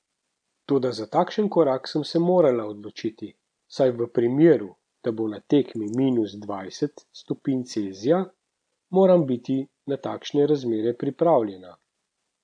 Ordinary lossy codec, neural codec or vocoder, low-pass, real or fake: none; none; 9.9 kHz; real